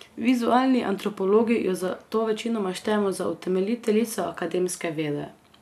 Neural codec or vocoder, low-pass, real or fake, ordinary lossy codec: none; 14.4 kHz; real; none